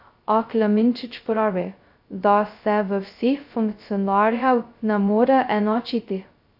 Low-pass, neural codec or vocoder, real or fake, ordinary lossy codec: 5.4 kHz; codec, 16 kHz, 0.2 kbps, FocalCodec; fake; AAC, 48 kbps